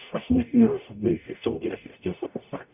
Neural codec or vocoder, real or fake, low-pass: codec, 44.1 kHz, 0.9 kbps, DAC; fake; 3.6 kHz